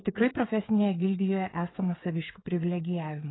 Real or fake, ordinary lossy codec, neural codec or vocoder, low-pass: fake; AAC, 16 kbps; codec, 16 kHz, 8 kbps, FreqCodec, smaller model; 7.2 kHz